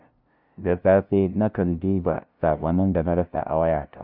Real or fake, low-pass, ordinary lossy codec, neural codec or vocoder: fake; 5.4 kHz; none; codec, 16 kHz, 0.5 kbps, FunCodec, trained on LibriTTS, 25 frames a second